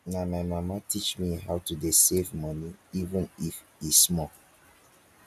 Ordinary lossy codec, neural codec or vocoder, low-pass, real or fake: none; none; 14.4 kHz; real